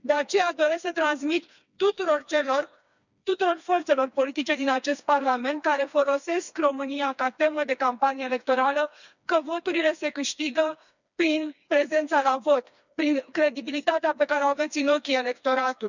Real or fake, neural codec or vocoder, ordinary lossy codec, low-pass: fake; codec, 16 kHz, 2 kbps, FreqCodec, smaller model; none; 7.2 kHz